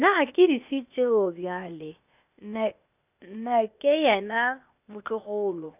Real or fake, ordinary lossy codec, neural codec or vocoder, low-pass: fake; none; codec, 16 kHz, 0.8 kbps, ZipCodec; 3.6 kHz